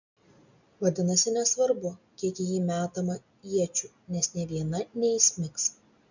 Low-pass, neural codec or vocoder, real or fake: 7.2 kHz; none; real